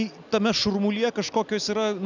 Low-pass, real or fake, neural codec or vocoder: 7.2 kHz; real; none